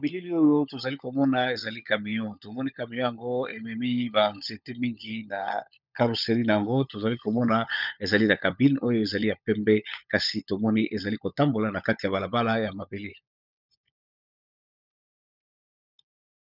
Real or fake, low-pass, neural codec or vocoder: fake; 5.4 kHz; codec, 16 kHz, 16 kbps, FunCodec, trained on LibriTTS, 50 frames a second